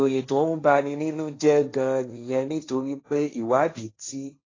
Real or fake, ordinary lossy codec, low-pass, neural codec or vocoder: fake; AAC, 32 kbps; 7.2 kHz; codec, 16 kHz, 1.1 kbps, Voila-Tokenizer